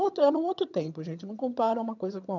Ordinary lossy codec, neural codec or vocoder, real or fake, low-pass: none; vocoder, 22.05 kHz, 80 mel bands, HiFi-GAN; fake; 7.2 kHz